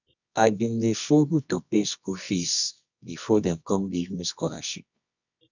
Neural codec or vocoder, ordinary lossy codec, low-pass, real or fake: codec, 24 kHz, 0.9 kbps, WavTokenizer, medium music audio release; none; 7.2 kHz; fake